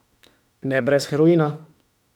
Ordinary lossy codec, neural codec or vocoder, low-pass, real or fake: none; autoencoder, 48 kHz, 32 numbers a frame, DAC-VAE, trained on Japanese speech; 19.8 kHz; fake